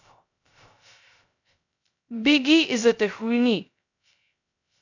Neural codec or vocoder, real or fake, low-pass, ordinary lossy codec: codec, 16 kHz, 0.2 kbps, FocalCodec; fake; 7.2 kHz; none